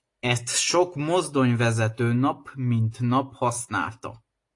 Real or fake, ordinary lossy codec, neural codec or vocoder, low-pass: real; AAC, 48 kbps; none; 10.8 kHz